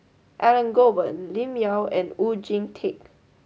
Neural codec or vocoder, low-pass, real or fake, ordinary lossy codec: none; none; real; none